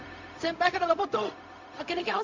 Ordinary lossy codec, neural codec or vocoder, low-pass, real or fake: MP3, 48 kbps; codec, 16 kHz, 0.4 kbps, LongCat-Audio-Codec; 7.2 kHz; fake